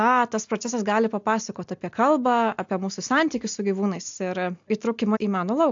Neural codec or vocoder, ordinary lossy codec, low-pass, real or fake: none; AAC, 64 kbps; 7.2 kHz; real